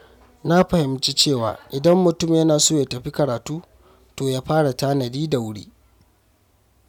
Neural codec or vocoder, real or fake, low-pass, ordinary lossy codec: none; real; 19.8 kHz; none